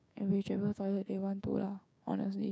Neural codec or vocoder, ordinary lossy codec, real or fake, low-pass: codec, 16 kHz, 6 kbps, DAC; none; fake; none